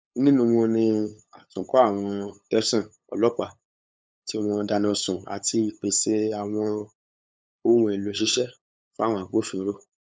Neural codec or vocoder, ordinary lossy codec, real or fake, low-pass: codec, 16 kHz, 8 kbps, FunCodec, trained on LibriTTS, 25 frames a second; none; fake; none